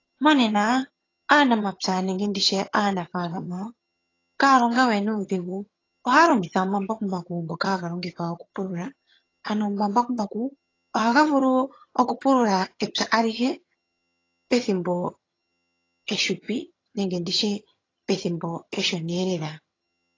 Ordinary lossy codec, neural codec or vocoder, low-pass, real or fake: AAC, 32 kbps; vocoder, 22.05 kHz, 80 mel bands, HiFi-GAN; 7.2 kHz; fake